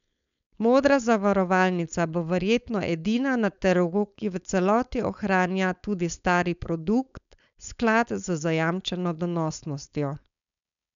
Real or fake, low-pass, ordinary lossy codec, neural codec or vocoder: fake; 7.2 kHz; none; codec, 16 kHz, 4.8 kbps, FACodec